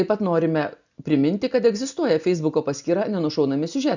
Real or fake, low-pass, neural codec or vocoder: real; 7.2 kHz; none